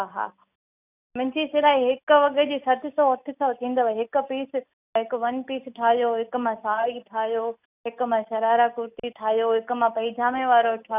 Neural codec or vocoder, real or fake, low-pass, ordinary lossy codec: none; real; 3.6 kHz; none